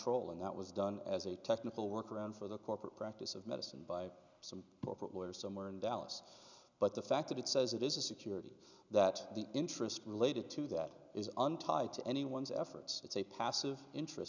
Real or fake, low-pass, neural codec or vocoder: real; 7.2 kHz; none